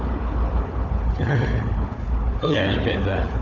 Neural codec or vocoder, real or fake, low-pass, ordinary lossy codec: codec, 16 kHz, 4 kbps, FunCodec, trained on Chinese and English, 50 frames a second; fake; 7.2 kHz; none